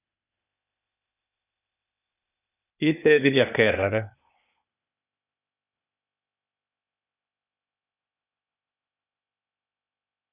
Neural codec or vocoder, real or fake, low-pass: codec, 16 kHz, 0.8 kbps, ZipCodec; fake; 3.6 kHz